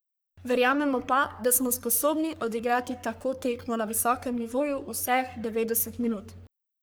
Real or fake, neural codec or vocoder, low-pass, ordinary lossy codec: fake; codec, 44.1 kHz, 3.4 kbps, Pupu-Codec; none; none